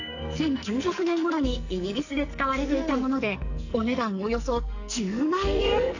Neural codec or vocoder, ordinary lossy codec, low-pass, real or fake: codec, 44.1 kHz, 2.6 kbps, SNAC; none; 7.2 kHz; fake